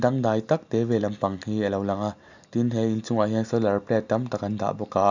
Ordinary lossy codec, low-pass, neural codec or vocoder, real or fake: none; 7.2 kHz; none; real